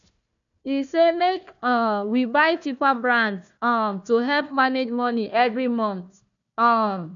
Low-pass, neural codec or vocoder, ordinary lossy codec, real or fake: 7.2 kHz; codec, 16 kHz, 1 kbps, FunCodec, trained on Chinese and English, 50 frames a second; none; fake